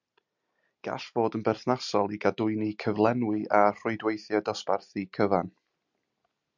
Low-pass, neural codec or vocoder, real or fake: 7.2 kHz; none; real